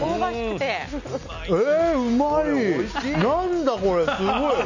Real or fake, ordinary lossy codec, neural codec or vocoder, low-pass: real; none; none; 7.2 kHz